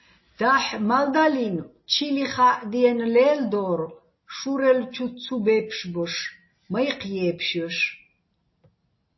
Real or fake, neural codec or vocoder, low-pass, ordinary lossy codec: real; none; 7.2 kHz; MP3, 24 kbps